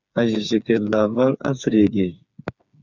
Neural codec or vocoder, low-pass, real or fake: codec, 16 kHz, 4 kbps, FreqCodec, smaller model; 7.2 kHz; fake